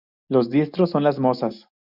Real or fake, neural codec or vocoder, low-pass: real; none; 5.4 kHz